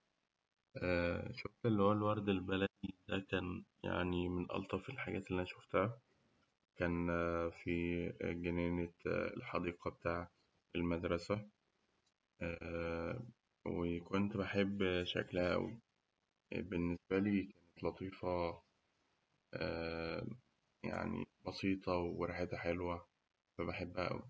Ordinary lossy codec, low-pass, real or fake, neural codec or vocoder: none; none; real; none